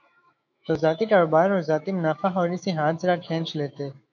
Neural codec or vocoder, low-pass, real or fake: autoencoder, 48 kHz, 128 numbers a frame, DAC-VAE, trained on Japanese speech; 7.2 kHz; fake